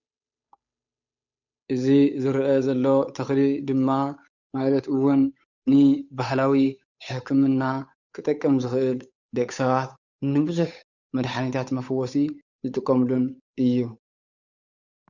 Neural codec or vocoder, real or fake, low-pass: codec, 16 kHz, 8 kbps, FunCodec, trained on Chinese and English, 25 frames a second; fake; 7.2 kHz